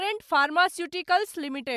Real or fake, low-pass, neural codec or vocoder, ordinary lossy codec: real; 14.4 kHz; none; MP3, 96 kbps